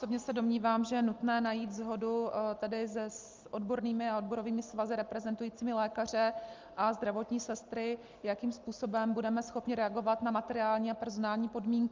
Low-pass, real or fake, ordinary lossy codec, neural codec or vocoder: 7.2 kHz; real; Opus, 24 kbps; none